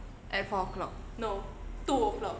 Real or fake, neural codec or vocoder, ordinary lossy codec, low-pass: real; none; none; none